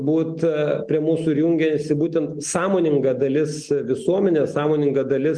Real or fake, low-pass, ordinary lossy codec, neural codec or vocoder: real; 9.9 kHz; Opus, 24 kbps; none